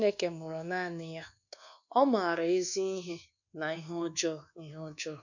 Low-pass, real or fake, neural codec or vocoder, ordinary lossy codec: 7.2 kHz; fake; codec, 24 kHz, 1.2 kbps, DualCodec; none